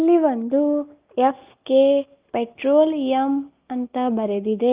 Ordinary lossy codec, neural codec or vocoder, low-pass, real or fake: Opus, 24 kbps; none; 3.6 kHz; real